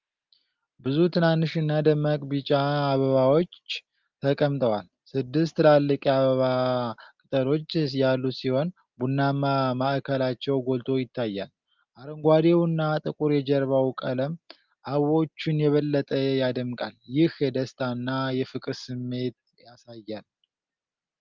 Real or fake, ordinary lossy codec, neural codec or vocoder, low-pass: real; Opus, 32 kbps; none; 7.2 kHz